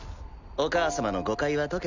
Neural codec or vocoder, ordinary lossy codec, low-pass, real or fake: none; none; 7.2 kHz; real